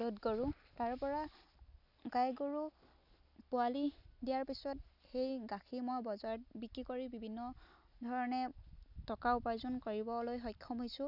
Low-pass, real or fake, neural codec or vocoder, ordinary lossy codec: 5.4 kHz; real; none; none